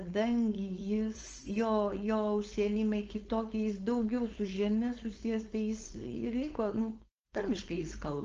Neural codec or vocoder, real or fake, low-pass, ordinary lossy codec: codec, 16 kHz, 4.8 kbps, FACodec; fake; 7.2 kHz; Opus, 24 kbps